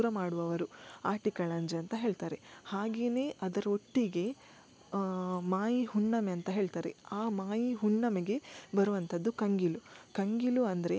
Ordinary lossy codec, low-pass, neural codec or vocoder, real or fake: none; none; none; real